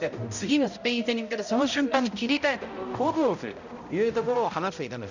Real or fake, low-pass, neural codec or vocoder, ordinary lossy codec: fake; 7.2 kHz; codec, 16 kHz, 0.5 kbps, X-Codec, HuBERT features, trained on balanced general audio; none